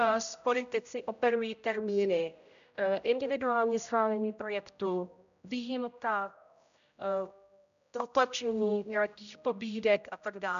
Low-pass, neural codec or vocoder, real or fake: 7.2 kHz; codec, 16 kHz, 0.5 kbps, X-Codec, HuBERT features, trained on general audio; fake